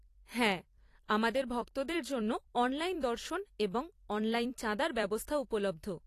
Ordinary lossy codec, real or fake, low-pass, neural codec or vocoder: AAC, 48 kbps; fake; 14.4 kHz; vocoder, 44.1 kHz, 128 mel bands every 256 samples, BigVGAN v2